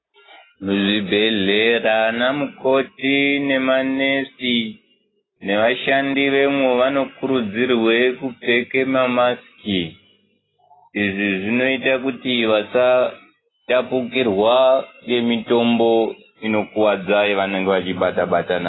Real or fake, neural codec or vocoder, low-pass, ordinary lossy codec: real; none; 7.2 kHz; AAC, 16 kbps